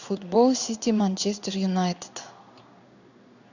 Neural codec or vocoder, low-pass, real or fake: codec, 16 kHz in and 24 kHz out, 1 kbps, XY-Tokenizer; 7.2 kHz; fake